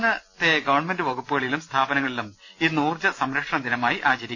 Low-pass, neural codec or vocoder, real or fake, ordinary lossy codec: 7.2 kHz; none; real; none